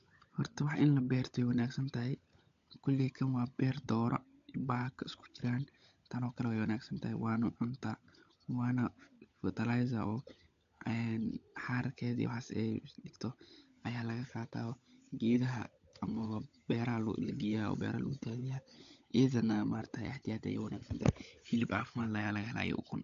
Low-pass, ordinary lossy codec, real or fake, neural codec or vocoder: 7.2 kHz; AAC, 64 kbps; fake; codec, 16 kHz, 16 kbps, FunCodec, trained on LibriTTS, 50 frames a second